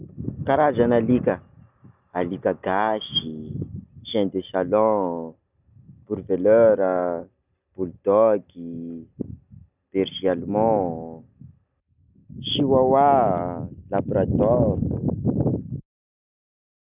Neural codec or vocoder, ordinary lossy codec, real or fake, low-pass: none; none; real; 3.6 kHz